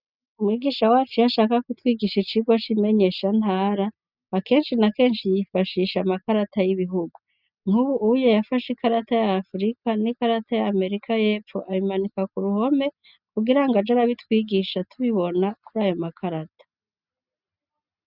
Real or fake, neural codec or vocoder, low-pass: real; none; 5.4 kHz